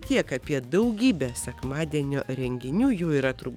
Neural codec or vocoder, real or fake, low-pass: codec, 44.1 kHz, 7.8 kbps, DAC; fake; 19.8 kHz